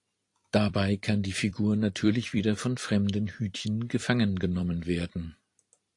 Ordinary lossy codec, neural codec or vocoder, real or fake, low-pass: AAC, 48 kbps; vocoder, 44.1 kHz, 128 mel bands every 256 samples, BigVGAN v2; fake; 10.8 kHz